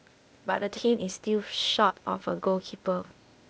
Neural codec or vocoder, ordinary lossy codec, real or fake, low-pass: codec, 16 kHz, 0.8 kbps, ZipCodec; none; fake; none